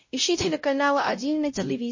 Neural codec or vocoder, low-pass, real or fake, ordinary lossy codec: codec, 16 kHz, 0.5 kbps, X-Codec, WavLM features, trained on Multilingual LibriSpeech; 7.2 kHz; fake; MP3, 32 kbps